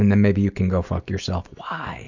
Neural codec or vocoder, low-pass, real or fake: none; 7.2 kHz; real